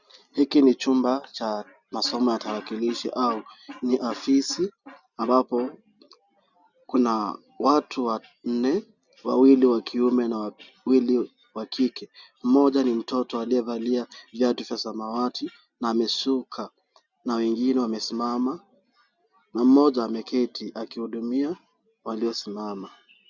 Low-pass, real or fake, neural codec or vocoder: 7.2 kHz; real; none